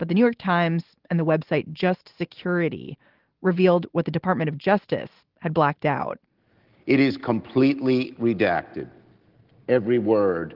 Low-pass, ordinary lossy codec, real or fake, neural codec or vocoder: 5.4 kHz; Opus, 16 kbps; real; none